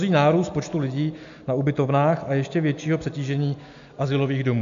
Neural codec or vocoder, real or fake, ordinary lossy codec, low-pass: none; real; MP3, 64 kbps; 7.2 kHz